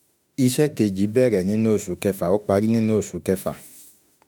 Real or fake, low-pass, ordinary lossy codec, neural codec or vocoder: fake; none; none; autoencoder, 48 kHz, 32 numbers a frame, DAC-VAE, trained on Japanese speech